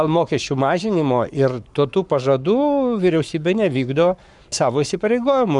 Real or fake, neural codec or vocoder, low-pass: fake; codec, 44.1 kHz, 7.8 kbps, Pupu-Codec; 10.8 kHz